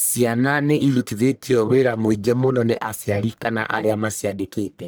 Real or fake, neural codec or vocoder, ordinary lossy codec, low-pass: fake; codec, 44.1 kHz, 1.7 kbps, Pupu-Codec; none; none